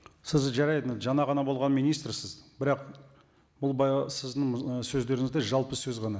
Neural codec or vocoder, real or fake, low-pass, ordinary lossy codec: none; real; none; none